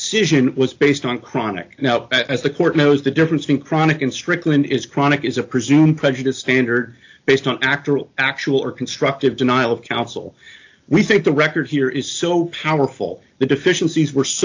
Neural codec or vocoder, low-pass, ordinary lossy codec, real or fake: none; 7.2 kHz; AAC, 48 kbps; real